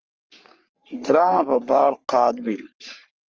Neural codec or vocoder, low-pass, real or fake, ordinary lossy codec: codec, 44.1 kHz, 3.4 kbps, Pupu-Codec; 7.2 kHz; fake; Opus, 24 kbps